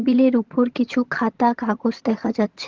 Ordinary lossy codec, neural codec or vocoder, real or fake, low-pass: Opus, 16 kbps; vocoder, 22.05 kHz, 80 mel bands, HiFi-GAN; fake; 7.2 kHz